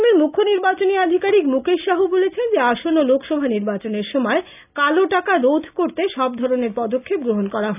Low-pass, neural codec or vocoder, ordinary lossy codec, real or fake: 3.6 kHz; none; none; real